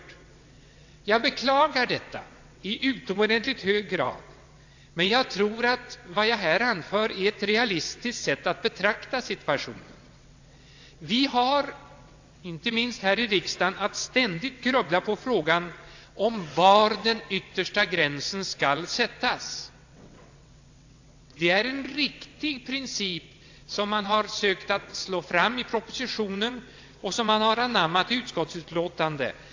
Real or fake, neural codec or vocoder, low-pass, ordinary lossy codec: fake; vocoder, 22.05 kHz, 80 mel bands, WaveNeXt; 7.2 kHz; AAC, 48 kbps